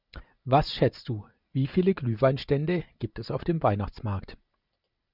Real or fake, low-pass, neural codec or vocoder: fake; 5.4 kHz; vocoder, 44.1 kHz, 128 mel bands every 512 samples, BigVGAN v2